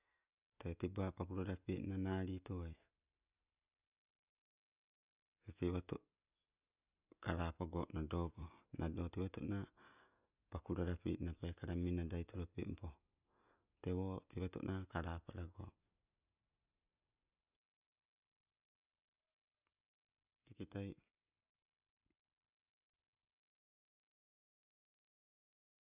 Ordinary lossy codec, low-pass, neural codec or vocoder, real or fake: none; 3.6 kHz; none; real